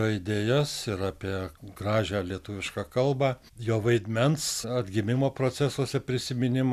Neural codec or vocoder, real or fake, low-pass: none; real; 14.4 kHz